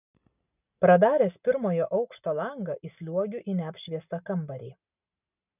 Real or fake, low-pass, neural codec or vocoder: real; 3.6 kHz; none